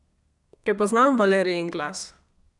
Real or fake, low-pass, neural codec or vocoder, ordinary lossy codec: fake; 10.8 kHz; codec, 32 kHz, 1.9 kbps, SNAC; none